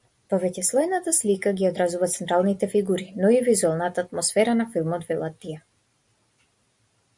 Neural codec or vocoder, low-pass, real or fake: none; 10.8 kHz; real